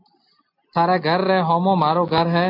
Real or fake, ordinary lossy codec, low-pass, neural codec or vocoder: real; AAC, 32 kbps; 5.4 kHz; none